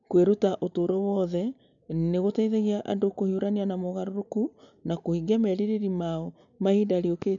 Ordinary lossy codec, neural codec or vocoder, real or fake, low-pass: none; none; real; 7.2 kHz